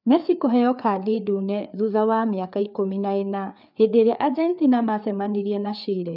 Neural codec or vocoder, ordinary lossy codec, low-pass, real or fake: codec, 16 kHz, 4 kbps, FreqCodec, larger model; none; 5.4 kHz; fake